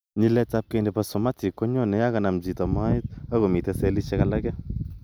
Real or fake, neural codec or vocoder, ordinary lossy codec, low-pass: real; none; none; none